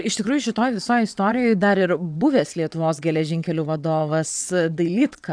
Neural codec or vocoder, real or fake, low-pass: vocoder, 22.05 kHz, 80 mel bands, WaveNeXt; fake; 9.9 kHz